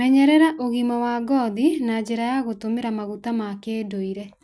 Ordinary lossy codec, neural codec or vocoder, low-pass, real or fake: none; none; none; real